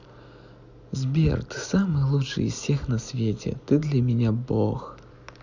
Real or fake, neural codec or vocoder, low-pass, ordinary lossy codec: real; none; 7.2 kHz; none